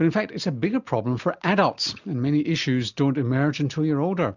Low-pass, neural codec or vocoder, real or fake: 7.2 kHz; none; real